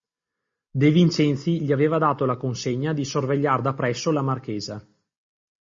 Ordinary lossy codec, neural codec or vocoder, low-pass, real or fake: MP3, 32 kbps; none; 7.2 kHz; real